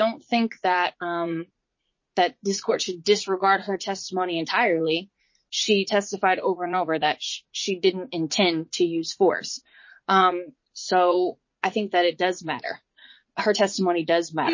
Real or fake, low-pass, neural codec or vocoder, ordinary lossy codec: fake; 7.2 kHz; vocoder, 44.1 kHz, 80 mel bands, Vocos; MP3, 32 kbps